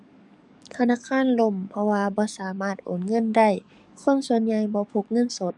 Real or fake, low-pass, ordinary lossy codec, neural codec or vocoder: fake; 10.8 kHz; none; codec, 44.1 kHz, 7.8 kbps, DAC